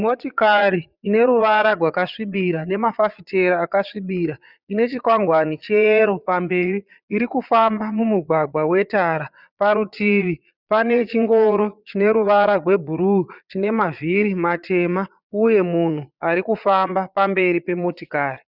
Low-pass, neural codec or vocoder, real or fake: 5.4 kHz; vocoder, 22.05 kHz, 80 mel bands, Vocos; fake